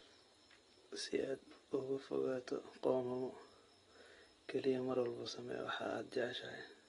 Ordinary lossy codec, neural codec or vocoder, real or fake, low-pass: AAC, 32 kbps; none; real; 10.8 kHz